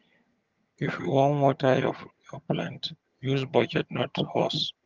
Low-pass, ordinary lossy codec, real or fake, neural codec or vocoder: 7.2 kHz; Opus, 32 kbps; fake; vocoder, 22.05 kHz, 80 mel bands, HiFi-GAN